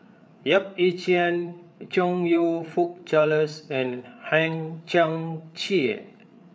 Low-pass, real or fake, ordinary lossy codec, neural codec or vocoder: none; fake; none; codec, 16 kHz, 8 kbps, FreqCodec, larger model